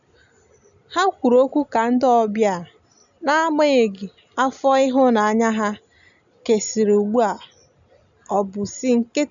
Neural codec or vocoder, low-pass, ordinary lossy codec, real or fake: none; 7.2 kHz; none; real